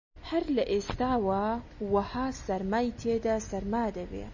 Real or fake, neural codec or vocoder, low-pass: real; none; 7.2 kHz